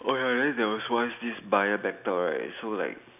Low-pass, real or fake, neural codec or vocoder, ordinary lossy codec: 3.6 kHz; real; none; none